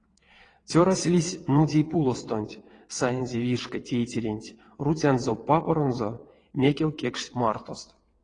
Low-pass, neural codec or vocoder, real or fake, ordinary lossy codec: 9.9 kHz; vocoder, 22.05 kHz, 80 mel bands, WaveNeXt; fake; AAC, 32 kbps